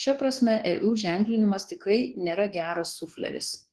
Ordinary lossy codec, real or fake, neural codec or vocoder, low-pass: Opus, 16 kbps; fake; codec, 24 kHz, 1.2 kbps, DualCodec; 10.8 kHz